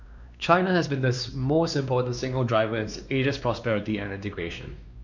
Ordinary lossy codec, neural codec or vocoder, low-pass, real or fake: none; codec, 16 kHz, 2 kbps, X-Codec, WavLM features, trained on Multilingual LibriSpeech; 7.2 kHz; fake